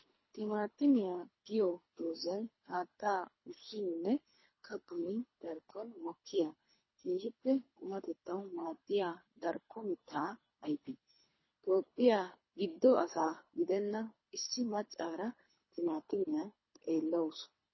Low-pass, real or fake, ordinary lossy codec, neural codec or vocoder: 7.2 kHz; fake; MP3, 24 kbps; codec, 24 kHz, 3 kbps, HILCodec